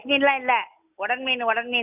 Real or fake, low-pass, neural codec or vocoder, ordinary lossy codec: real; 3.6 kHz; none; none